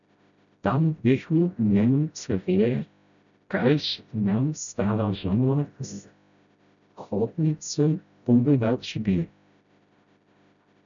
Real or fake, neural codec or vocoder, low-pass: fake; codec, 16 kHz, 0.5 kbps, FreqCodec, smaller model; 7.2 kHz